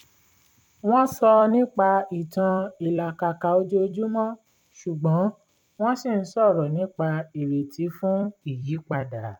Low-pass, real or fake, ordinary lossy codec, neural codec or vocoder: 19.8 kHz; fake; MP3, 96 kbps; vocoder, 44.1 kHz, 128 mel bands every 256 samples, BigVGAN v2